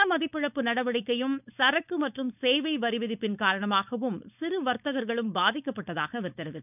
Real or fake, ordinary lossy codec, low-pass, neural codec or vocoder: fake; none; 3.6 kHz; codec, 16 kHz, 4.8 kbps, FACodec